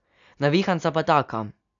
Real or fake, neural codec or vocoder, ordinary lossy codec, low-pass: real; none; none; 7.2 kHz